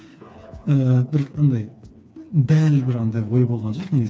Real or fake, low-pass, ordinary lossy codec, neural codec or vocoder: fake; none; none; codec, 16 kHz, 4 kbps, FreqCodec, smaller model